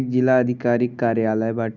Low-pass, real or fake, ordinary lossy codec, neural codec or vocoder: 7.2 kHz; real; none; none